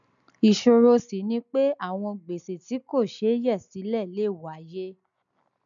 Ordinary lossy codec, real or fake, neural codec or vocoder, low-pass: none; real; none; 7.2 kHz